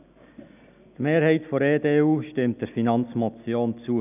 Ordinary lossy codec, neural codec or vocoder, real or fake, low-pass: none; none; real; 3.6 kHz